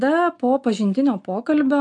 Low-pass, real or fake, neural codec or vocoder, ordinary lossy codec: 10.8 kHz; real; none; MP3, 64 kbps